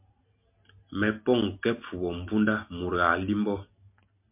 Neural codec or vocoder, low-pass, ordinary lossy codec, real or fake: none; 3.6 kHz; MP3, 32 kbps; real